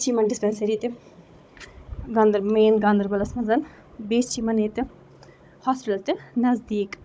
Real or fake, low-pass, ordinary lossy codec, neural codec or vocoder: fake; none; none; codec, 16 kHz, 16 kbps, FunCodec, trained on Chinese and English, 50 frames a second